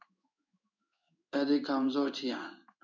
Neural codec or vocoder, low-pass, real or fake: codec, 16 kHz in and 24 kHz out, 1 kbps, XY-Tokenizer; 7.2 kHz; fake